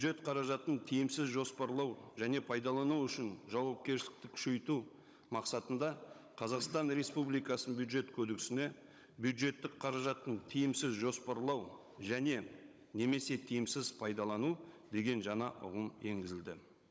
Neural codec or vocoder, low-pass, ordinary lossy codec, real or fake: codec, 16 kHz, 16 kbps, FunCodec, trained on Chinese and English, 50 frames a second; none; none; fake